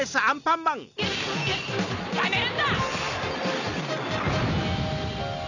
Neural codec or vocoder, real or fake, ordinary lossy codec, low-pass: none; real; none; 7.2 kHz